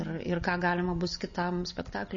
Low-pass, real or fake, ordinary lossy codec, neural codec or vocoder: 7.2 kHz; real; MP3, 32 kbps; none